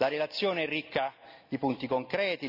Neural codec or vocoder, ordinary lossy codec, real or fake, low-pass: none; none; real; 5.4 kHz